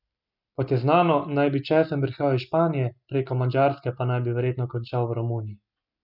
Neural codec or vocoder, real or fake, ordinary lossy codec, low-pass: none; real; none; 5.4 kHz